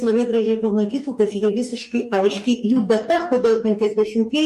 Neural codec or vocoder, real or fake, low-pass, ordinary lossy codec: codec, 44.1 kHz, 2.6 kbps, DAC; fake; 14.4 kHz; MP3, 64 kbps